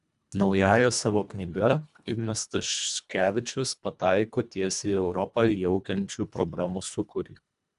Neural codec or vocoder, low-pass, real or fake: codec, 24 kHz, 1.5 kbps, HILCodec; 10.8 kHz; fake